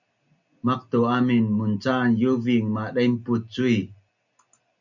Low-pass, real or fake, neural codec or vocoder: 7.2 kHz; real; none